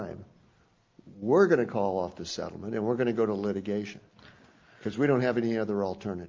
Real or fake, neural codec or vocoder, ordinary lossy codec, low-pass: real; none; Opus, 32 kbps; 7.2 kHz